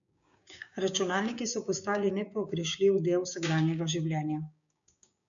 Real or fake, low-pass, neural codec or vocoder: fake; 7.2 kHz; codec, 16 kHz, 6 kbps, DAC